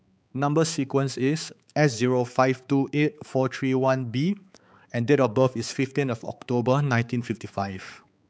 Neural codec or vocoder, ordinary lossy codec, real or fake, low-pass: codec, 16 kHz, 4 kbps, X-Codec, HuBERT features, trained on balanced general audio; none; fake; none